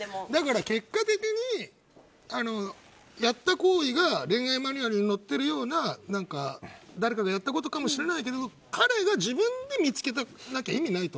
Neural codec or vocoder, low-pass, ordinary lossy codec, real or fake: none; none; none; real